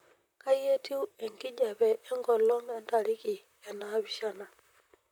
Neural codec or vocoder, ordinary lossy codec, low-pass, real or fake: vocoder, 44.1 kHz, 128 mel bands, Pupu-Vocoder; none; none; fake